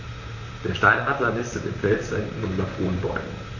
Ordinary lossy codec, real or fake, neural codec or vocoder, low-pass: none; fake; vocoder, 44.1 kHz, 128 mel bands, Pupu-Vocoder; 7.2 kHz